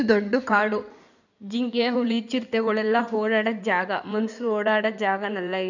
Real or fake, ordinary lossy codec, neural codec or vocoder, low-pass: fake; none; codec, 16 kHz in and 24 kHz out, 2.2 kbps, FireRedTTS-2 codec; 7.2 kHz